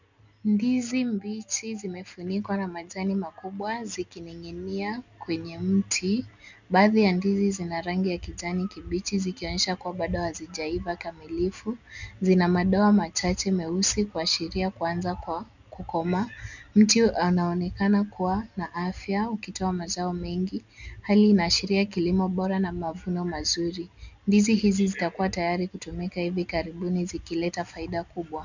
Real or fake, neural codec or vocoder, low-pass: real; none; 7.2 kHz